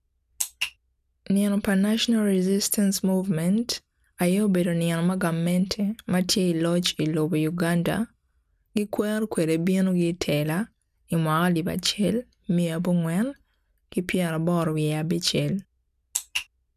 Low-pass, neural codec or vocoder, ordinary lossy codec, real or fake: 14.4 kHz; none; none; real